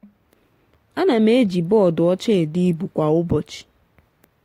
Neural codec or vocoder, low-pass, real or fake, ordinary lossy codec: none; 19.8 kHz; real; AAC, 48 kbps